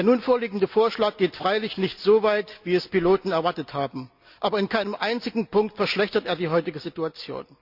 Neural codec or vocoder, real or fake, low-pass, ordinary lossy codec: none; real; 5.4 kHz; Opus, 64 kbps